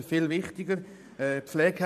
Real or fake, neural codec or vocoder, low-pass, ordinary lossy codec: fake; vocoder, 44.1 kHz, 128 mel bands every 512 samples, BigVGAN v2; 14.4 kHz; none